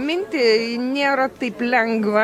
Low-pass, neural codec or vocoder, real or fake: 19.8 kHz; none; real